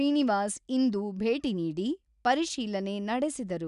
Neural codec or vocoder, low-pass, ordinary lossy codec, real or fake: none; 10.8 kHz; none; real